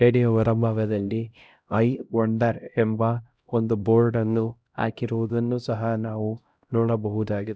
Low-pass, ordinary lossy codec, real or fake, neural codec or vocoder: none; none; fake; codec, 16 kHz, 0.5 kbps, X-Codec, HuBERT features, trained on LibriSpeech